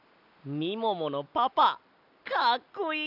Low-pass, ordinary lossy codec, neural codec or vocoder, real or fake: 5.4 kHz; none; none; real